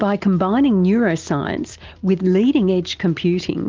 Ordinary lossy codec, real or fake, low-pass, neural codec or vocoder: Opus, 32 kbps; real; 7.2 kHz; none